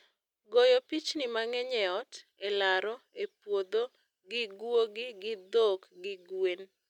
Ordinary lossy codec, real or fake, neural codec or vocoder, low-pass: none; real; none; 19.8 kHz